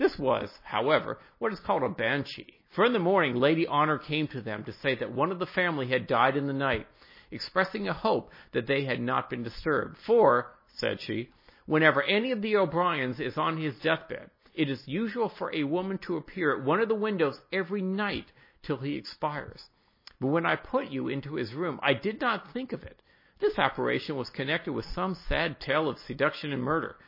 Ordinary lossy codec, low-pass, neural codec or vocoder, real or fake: MP3, 24 kbps; 5.4 kHz; none; real